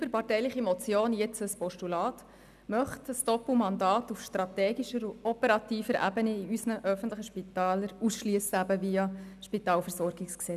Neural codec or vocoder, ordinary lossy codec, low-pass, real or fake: none; none; 14.4 kHz; real